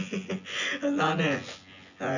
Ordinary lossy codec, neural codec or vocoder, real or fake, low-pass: none; vocoder, 24 kHz, 100 mel bands, Vocos; fake; 7.2 kHz